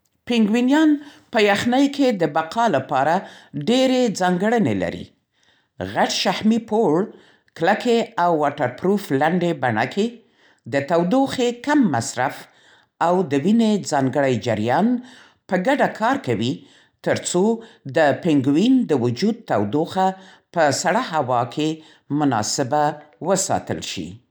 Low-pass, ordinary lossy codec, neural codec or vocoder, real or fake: none; none; none; real